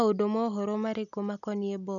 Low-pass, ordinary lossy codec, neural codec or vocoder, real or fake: 7.2 kHz; MP3, 96 kbps; none; real